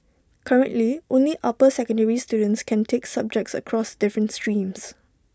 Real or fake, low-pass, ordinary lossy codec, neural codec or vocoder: real; none; none; none